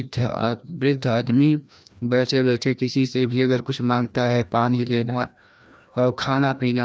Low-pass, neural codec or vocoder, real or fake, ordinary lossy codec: none; codec, 16 kHz, 1 kbps, FreqCodec, larger model; fake; none